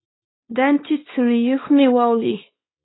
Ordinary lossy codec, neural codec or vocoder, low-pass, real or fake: AAC, 16 kbps; codec, 24 kHz, 0.9 kbps, WavTokenizer, small release; 7.2 kHz; fake